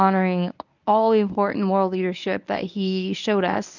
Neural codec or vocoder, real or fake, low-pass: codec, 24 kHz, 0.9 kbps, WavTokenizer, medium speech release version 1; fake; 7.2 kHz